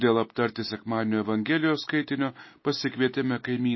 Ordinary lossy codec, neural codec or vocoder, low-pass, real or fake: MP3, 24 kbps; none; 7.2 kHz; real